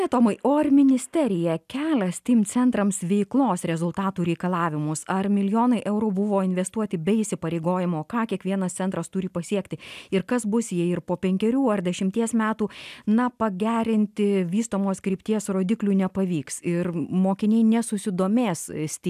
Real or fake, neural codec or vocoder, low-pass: real; none; 14.4 kHz